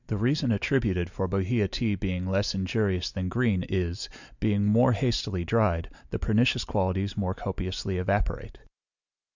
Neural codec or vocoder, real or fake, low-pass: none; real; 7.2 kHz